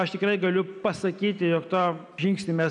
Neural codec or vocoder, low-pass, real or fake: none; 10.8 kHz; real